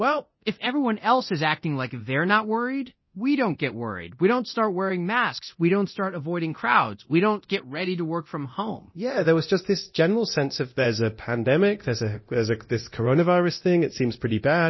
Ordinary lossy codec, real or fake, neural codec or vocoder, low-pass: MP3, 24 kbps; fake; codec, 24 kHz, 0.9 kbps, DualCodec; 7.2 kHz